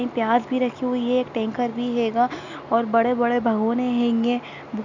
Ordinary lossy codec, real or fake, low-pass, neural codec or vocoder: none; real; 7.2 kHz; none